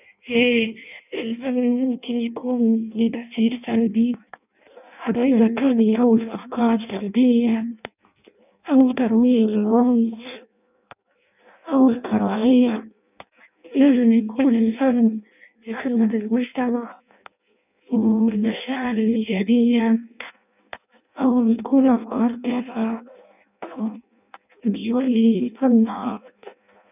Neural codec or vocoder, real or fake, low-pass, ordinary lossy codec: codec, 16 kHz in and 24 kHz out, 0.6 kbps, FireRedTTS-2 codec; fake; 3.6 kHz; none